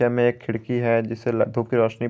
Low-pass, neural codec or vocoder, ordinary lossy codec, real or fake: none; none; none; real